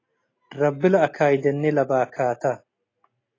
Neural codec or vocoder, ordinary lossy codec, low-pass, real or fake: none; AAC, 32 kbps; 7.2 kHz; real